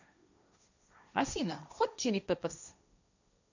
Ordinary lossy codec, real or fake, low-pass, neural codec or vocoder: none; fake; 7.2 kHz; codec, 16 kHz, 1.1 kbps, Voila-Tokenizer